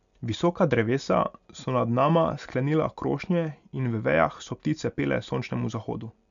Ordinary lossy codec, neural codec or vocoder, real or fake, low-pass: none; none; real; 7.2 kHz